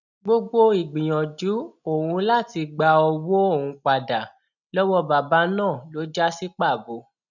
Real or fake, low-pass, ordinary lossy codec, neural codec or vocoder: real; 7.2 kHz; none; none